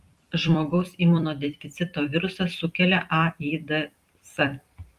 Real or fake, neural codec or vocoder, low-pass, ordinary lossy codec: fake; vocoder, 44.1 kHz, 128 mel bands every 256 samples, BigVGAN v2; 14.4 kHz; Opus, 32 kbps